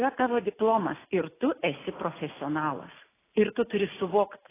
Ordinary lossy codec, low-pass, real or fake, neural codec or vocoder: AAC, 16 kbps; 3.6 kHz; real; none